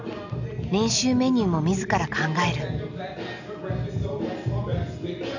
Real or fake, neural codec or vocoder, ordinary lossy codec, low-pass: real; none; none; 7.2 kHz